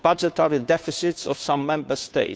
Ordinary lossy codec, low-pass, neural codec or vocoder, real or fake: none; none; codec, 16 kHz, 2 kbps, FunCodec, trained on Chinese and English, 25 frames a second; fake